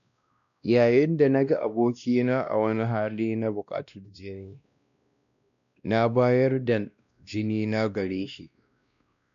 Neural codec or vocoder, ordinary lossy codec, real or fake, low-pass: codec, 16 kHz, 1 kbps, X-Codec, WavLM features, trained on Multilingual LibriSpeech; none; fake; 7.2 kHz